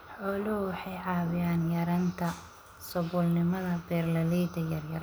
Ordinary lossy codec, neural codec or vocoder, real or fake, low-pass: none; none; real; none